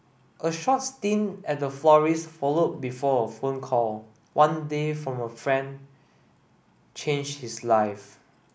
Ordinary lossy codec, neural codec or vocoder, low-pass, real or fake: none; none; none; real